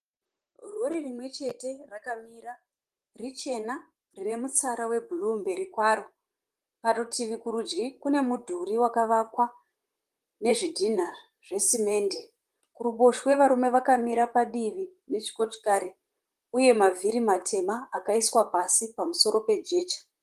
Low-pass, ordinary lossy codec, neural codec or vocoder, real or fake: 14.4 kHz; Opus, 24 kbps; vocoder, 44.1 kHz, 128 mel bands, Pupu-Vocoder; fake